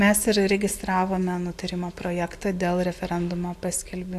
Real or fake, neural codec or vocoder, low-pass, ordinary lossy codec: real; none; 14.4 kHz; AAC, 64 kbps